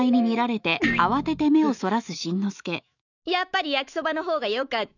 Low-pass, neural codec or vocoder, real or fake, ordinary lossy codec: 7.2 kHz; autoencoder, 48 kHz, 128 numbers a frame, DAC-VAE, trained on Japanese speech; fake; none